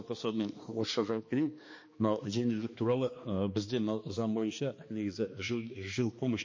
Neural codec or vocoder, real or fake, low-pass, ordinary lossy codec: codec, 16 kHz, 2 kbps, X-Codec, HuBERT features, trained on balanced general audio; fake; 7.2 kHz; MP3, 32 kbps